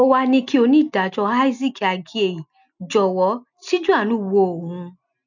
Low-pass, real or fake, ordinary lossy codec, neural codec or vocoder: 7.2 kHz; real; none; none